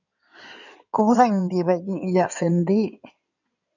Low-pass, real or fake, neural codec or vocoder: 7.2 kHz; fake; codec, 16 kHz in and 24 kHz out, 2.2 kbps, FireRedTTS-2 codec